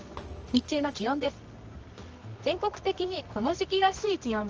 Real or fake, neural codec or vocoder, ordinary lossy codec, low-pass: fake; codec, 24 kHz, 0.9 kbps, WavTokenizer, medium music audio release; Opus, 24 kbps; 7.2 kHz